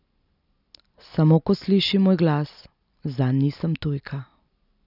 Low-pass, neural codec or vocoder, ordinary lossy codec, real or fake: 5.4 kHz; none; none; real